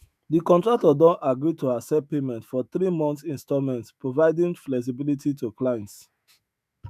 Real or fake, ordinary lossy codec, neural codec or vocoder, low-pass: fake; none; autoencoder, 48 kHz, 128 numbers a frame, DAC-VAE, trained on Japanese speech; 14.4 kHz